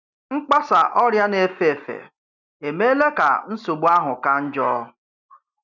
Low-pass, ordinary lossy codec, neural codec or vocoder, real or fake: 7.2 kHz; none; none; real